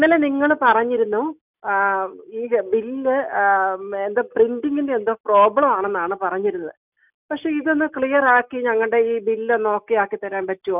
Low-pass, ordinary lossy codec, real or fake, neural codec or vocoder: 3.6 kHz; none; real; none